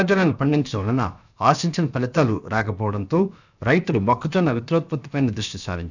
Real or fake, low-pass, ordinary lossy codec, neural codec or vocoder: fake; 7.2 kHz; none; codec, 16 kHz, about 1 kbps, DyCAST, with the encoder's durations